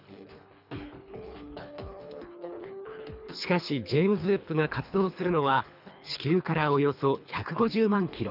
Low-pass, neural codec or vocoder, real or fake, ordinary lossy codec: 5.4 kHz; codec, 24 kHz, 3 kbps, HILCodec; fake; none